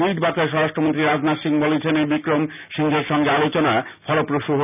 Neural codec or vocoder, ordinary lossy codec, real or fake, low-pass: none; none; real; 3.6 kHz